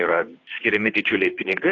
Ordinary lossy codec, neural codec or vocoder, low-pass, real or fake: AAC, 32 kbps; codec, 16 kHz, 2 kbps, FunCodec, trained on Chinese and English, 25 frames a second; 7.2 kHz; fake